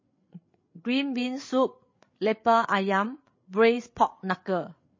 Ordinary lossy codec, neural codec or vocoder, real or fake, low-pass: MP3, 32 kbps; codec, 16 kHz, 8 kbps, FreqCodec, larger model; fake; 7.2 kHz